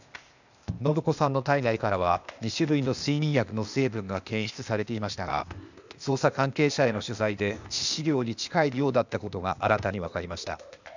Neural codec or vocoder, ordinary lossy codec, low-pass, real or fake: codec, 16 kHz, 0.8 kbps, ZipCodec; none; 7.2 kHz; fake